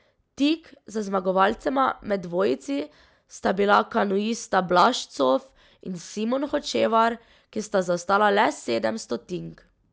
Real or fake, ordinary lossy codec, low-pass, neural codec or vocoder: real; none; none; none